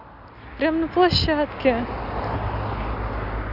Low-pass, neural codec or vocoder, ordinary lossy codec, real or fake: 5.4 kHz; none; none; real